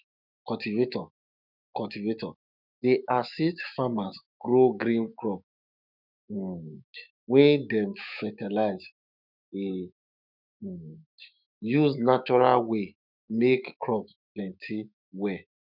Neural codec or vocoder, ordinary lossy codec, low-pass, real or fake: autoencoder, 48 kHz, 128 numbers a frame, DAC-VAE, trained on Japanese speech; none; 5.4 kHz; fake